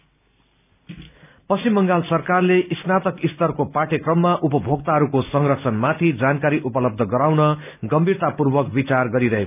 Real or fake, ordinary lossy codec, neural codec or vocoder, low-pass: real; none; none; 3.6 kHz